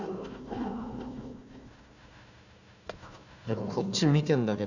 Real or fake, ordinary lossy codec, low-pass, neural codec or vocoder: fake; none; 7.2 kHz; codec, 16 kHz, 1 kbps, FunCodec, trained on Chinese and English, 50 frames a second